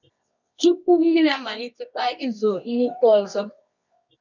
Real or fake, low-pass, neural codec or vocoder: fake; 7.2 kHz; codec, 24 kHz, 0.9 kbps, WavTokenizer, medium music audio release